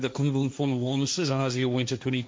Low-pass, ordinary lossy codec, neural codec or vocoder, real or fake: none; none; codec, 16 kHz, 1.1 kbps, Voila-Tokenizer; fake